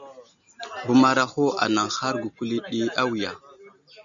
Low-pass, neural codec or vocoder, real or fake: 7.2 kHz; none; real